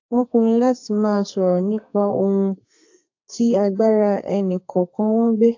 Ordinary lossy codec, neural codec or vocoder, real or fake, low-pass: AAC, 48 kbps; codec, 32 kHz, 1.9 kbps, SNAC; fake; 7.2 kHz